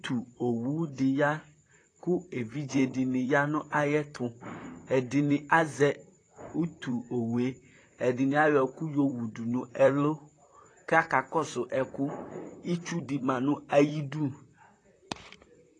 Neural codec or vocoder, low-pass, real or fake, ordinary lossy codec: vocoder, 44.1 kHz, 128 mel bands, Pupu-Vocoder; 9.9 kHz; fake; AAC, 32 kbps